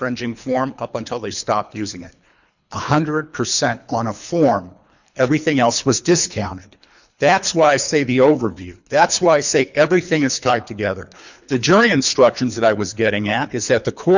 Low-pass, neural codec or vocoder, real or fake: 7.2 kHz; codec, 24 kHz, 3 kbps, HILCodec; fake